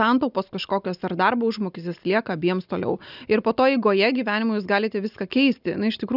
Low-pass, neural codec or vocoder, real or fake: 5.4 kHz; none; real